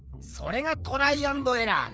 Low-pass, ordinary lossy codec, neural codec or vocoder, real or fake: none; none; codec, 16 kHz, 4 kbps, FreqCodec, larger model; fake